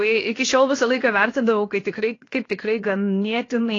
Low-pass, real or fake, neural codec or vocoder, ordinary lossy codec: 7.2 kHz; fake; codec, 16 kHz, 0.7 kbps, FocalCodec; AAC, 32 kbps